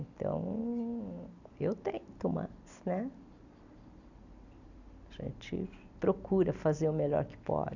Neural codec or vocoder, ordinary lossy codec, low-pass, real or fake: none; none; 7.2 kHz; real